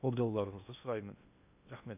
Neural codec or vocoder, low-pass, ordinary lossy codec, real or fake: codec, 16 kHz in and 24 kHz out, 0.6 kbps, FocalCodec, streaming, 2048 codes; 3.6 kHz; none; fake